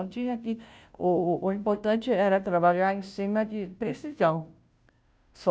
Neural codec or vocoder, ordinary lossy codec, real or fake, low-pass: codec, 16 kHz, 0.5 kbps, FunCodec, trained on Chinese and English, 25 frames a second; none; fake; none